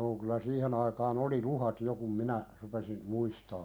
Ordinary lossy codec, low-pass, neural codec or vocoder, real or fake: none; none; none; real